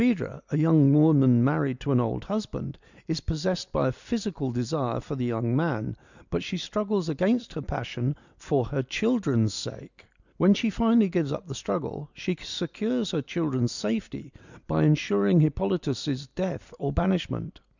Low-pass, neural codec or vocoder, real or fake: 7.2 kHz; none; real